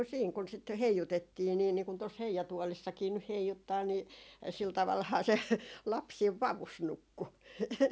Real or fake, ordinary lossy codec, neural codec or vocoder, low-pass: real; none; none; none